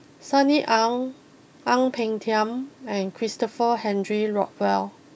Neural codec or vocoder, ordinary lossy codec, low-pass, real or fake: none; none; none; real